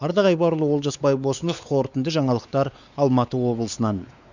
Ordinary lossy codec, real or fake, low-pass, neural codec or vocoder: none; fake; 7.2 kHz; codec, 16 kHz, 4 kbps, X-Codec, WavLM features, trained on Multilingual LibriSpeech